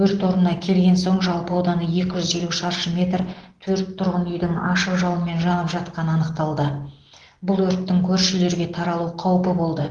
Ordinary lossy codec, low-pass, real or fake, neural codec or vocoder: Opus, 16 kbps; 9.9 kHz; real; none